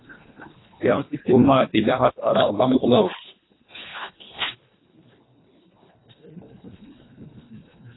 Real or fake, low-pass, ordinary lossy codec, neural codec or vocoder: fake; 7.2 kHz; AAC, 16 kbps; codec, 24 kHz, 1.5 kbps, HILCodec